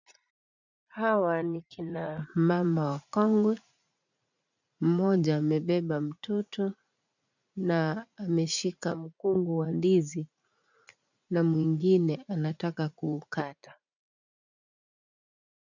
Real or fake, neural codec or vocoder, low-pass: fake; vocoder, 44.1 kHz, 80 mel bands, Vocos; 7.2 kHz